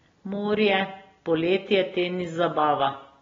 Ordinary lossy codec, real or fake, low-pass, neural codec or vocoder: AAC, 24 kbps; real; 7.2 kHz; none